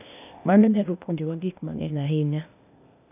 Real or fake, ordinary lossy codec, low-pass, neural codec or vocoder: fake; none; 3.6 kHz; codec, 16 kHz, 0.8 kbps, ZipCodec